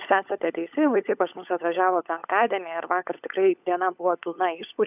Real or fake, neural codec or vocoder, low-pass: fake; codec, 16 kHz, 16 kbps, FunCodec, trained on LibriTTS, 50 frames a second; 3.6 kHz